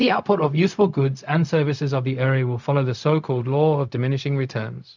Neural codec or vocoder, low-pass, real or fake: codec, 16 kHz, 0.4 kbps, LongCat-Audio-Codec; 7.2 kHz; fake